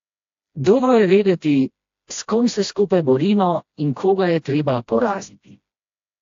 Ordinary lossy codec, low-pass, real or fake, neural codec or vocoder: AAC, 48 kbps; 7.2 kHz; fake; codec, 16 kHz, 1 kbps, FreqCodec, smaller model